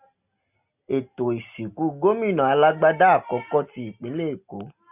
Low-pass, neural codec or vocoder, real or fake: 3.6 kHz; none; real